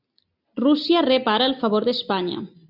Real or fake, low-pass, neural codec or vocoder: real; 5.4 kHz; none